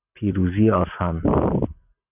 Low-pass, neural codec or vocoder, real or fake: 3.6 kHz; none; real